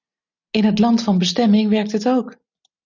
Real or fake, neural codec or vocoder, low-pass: real; none; 7.2 kHz